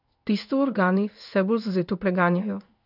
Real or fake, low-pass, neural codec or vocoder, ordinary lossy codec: fake; 5.4 kHz; codec, 16 kHz in and 24 kHz out, 1 kbps, XY-Tokenizer; none